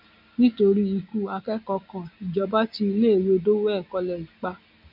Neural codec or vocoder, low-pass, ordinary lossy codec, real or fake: none; 5.4 kHz; none; real